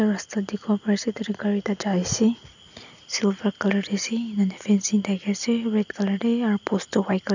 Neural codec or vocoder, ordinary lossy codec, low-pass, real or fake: none; none; 7.2 kHz; real